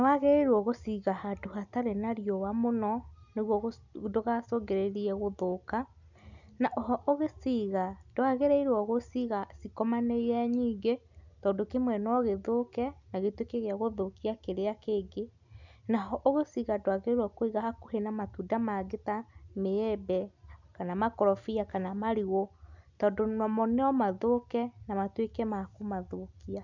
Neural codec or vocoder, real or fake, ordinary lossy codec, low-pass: none; real; none; 7.2 kHz